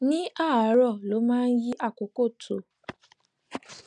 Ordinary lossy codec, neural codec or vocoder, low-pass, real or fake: none; none; 9.9 kHz; real